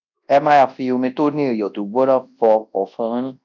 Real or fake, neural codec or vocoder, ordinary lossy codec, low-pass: fake; codec, 24 kHz, 0.9 kbps, WavTokenizer, large speech release; none; 7.2 kHz